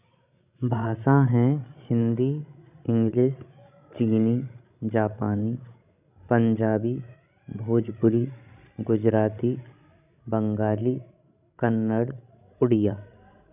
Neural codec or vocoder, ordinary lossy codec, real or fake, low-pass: codec, 16 kHz, 16 kbps, FreqCodec, larger model; none; fake; 3.6 kHz